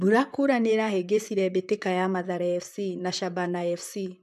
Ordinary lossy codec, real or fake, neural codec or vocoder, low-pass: AAC, 96 kbps; fake; vocoder, 44.1 kHz, 128 mel bands, Pupu-Vocoder; 14.4 kHz